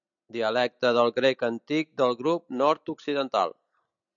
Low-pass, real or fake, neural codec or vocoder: 7.2 kHz; real; none